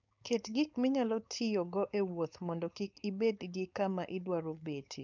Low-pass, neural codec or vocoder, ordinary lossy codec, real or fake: 7.2 kHz; codec, 16 kHz, 4.8 kbps, FACodec; none; fake